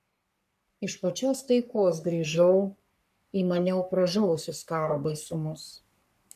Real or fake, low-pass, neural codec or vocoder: fake; 14.4 kHz; codec, 44.1 kHz, 3.4 kbps, Pupu-Codec